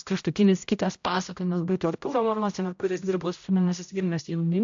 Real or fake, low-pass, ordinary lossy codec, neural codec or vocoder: fake; 7.2 kHz; AAC, 64 kbps; codec, 16 kHz, 0.5 kbps, X-Codec, HuBERT features, trained on general audio